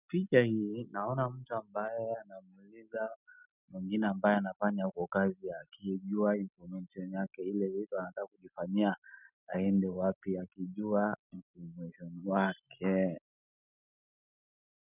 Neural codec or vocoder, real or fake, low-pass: none; real; 3.6 kHz